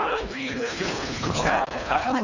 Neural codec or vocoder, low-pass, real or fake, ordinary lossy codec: codec, 24 kHz, 1.5 kbps, HILCodec; 7.2 kHz; fake; none